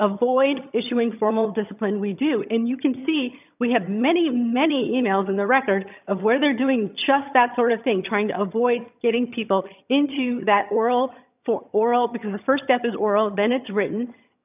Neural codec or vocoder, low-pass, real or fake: vocoder, 22.05 kHz, 80 mel bands, HiFi-GAN; 3.6 kHz; fake